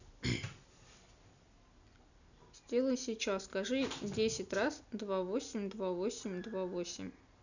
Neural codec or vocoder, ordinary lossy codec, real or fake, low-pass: none; none; real; 7.2 kHz